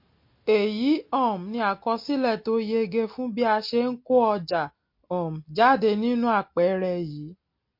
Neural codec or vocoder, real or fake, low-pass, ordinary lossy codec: none; real; 5.4 kHz; MP3, 32 kbps